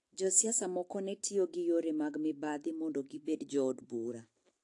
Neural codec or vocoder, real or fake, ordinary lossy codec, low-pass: none; real; AAC, 48 kbps; 10.8 kHz